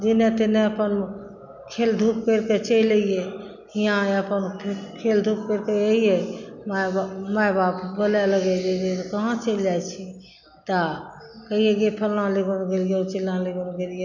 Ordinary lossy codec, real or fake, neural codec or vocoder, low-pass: none; real; none; 7.2 kHz